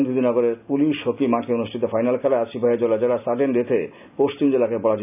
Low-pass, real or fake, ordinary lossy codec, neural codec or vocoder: 3.6 kHz; real; none; none